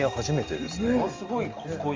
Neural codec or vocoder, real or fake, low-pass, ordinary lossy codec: none; real; 7.2 kHz; Opus, 32 kbps